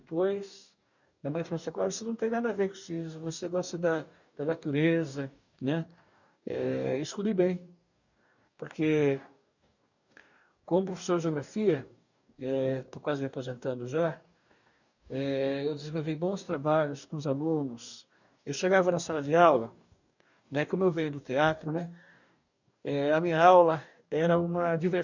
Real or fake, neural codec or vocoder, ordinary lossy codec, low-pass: fake; codec, 44.1 kHz, 2.6 kbps, DAC; none; 7.2 kHz